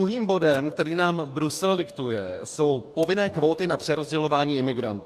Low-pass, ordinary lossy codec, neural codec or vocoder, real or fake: 14.4 kHz; AAC, 96 kbps; codec, 44.1 kHz, 2.6 kbps, DAC; fake